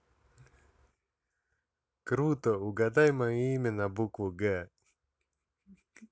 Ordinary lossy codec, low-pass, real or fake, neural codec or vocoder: none; none; real; none